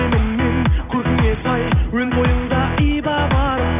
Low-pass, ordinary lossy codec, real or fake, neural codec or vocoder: 3.6 kHz; AAC, 32 kbps; real; none